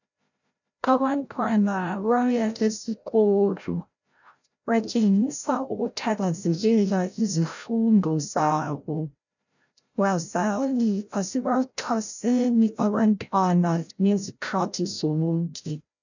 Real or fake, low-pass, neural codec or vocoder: fake; 7.2 kHz; codec, 16 kHz, 0.5 kbps, FreqCodec, larger model